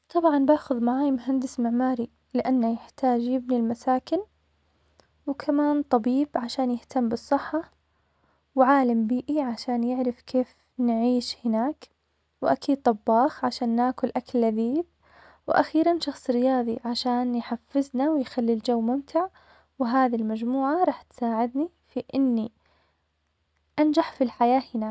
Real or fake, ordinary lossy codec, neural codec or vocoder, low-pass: real; none; none; none